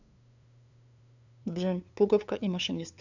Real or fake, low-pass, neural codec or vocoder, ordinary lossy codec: fake; 7.2 kHz; codec, 16 kHz, 2 kbps, FunCodec, trained on LibriTTS, 25 frames a second; none